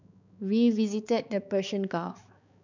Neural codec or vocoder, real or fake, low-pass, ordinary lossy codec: codec, 16 kHz, 4 kbps, X-Codec, HuBERT features, trained on balanced general audio; fake; 7.2 kHz; none